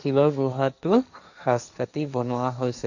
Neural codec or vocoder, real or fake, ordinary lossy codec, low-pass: codec, 16 kHz, 1.1 kbps, Voila-Tokenizer; fake; none; 7.2 kHz